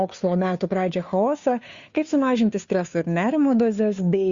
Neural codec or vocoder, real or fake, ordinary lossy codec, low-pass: codec, 16 kHz, 2 kbps, FunCodec, trained on Chinese and English, 25 frames a second; fake; Opus, 64 kbps; 7.2 kHz